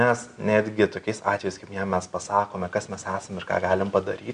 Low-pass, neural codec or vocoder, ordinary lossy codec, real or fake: 9.9 kHz; none; MP3, 96 kbps; real